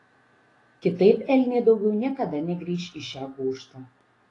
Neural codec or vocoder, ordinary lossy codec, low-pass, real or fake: autoencoder, 48 kHz, 128 numbers a frame, DAC-VAE, trained on Japanese speech; AAC, 32 kbps; 10.8 kHz; fake